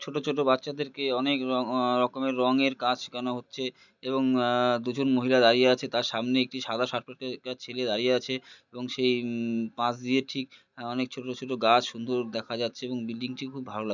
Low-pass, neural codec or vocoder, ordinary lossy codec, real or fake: 7.2 kHz; none; none; real